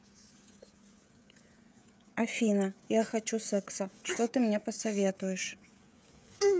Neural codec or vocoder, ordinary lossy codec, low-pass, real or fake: codec, 16 kHz, 16 kbps, FreqCodec, smaller model; none; none; fake